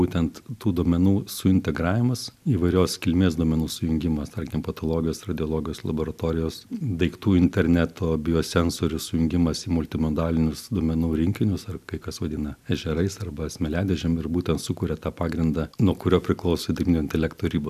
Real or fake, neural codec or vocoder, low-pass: real; none; 14.4 kHz